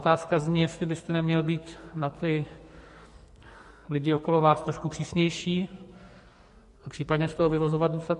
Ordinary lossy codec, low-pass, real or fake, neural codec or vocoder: MP3, 48 kbps; 14.4 kHz; fake; codec, 44.1 kHz, 2.6 kbps, SNAC